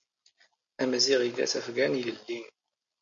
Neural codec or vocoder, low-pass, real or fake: none; 7.2 kHz; real